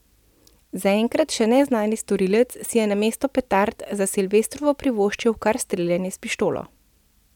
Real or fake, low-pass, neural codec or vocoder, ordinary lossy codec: real; 19.8 kHz; none; none